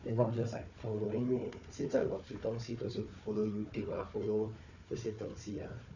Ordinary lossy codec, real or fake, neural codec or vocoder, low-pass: none; fake; codec, 16 kHz, 4 kbps, FunCodec, trained on Chinese and English, 50 frames a second; 7.2 kHz